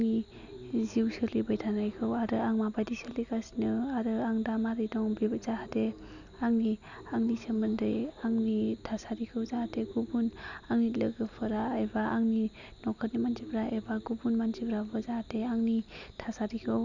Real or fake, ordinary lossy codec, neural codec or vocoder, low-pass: real; none; none; 7.2 kHz